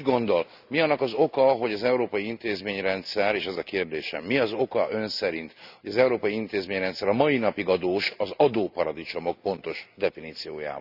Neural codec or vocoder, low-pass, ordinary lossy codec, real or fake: none; 5.4 kHz; none; real